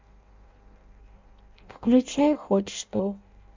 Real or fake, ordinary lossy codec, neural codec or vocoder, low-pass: fake; MP3, 64 kbps; codec, 16 kHz in and 24 kHz out, 0.6 kbps, FireRedTTS-2 codec; 7.2 kHz